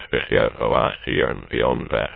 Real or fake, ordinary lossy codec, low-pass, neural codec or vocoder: fake; MP3, 24 kbps; 5.4 kHz; autoencoder, 22.05 kHz, a latent of 192 numbers a frame, VITS, trained on many speakers